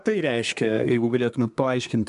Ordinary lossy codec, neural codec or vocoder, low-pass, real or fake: MP3, 96 kbps; codec, 24 kHz, 1 kbps, SNAC; 10.8 kHz; fake